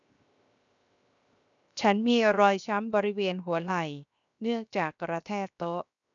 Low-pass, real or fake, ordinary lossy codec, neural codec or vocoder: 7.2 kHz; fake; none; codec, 16 kHz, 0.7 kbps, FocalCodec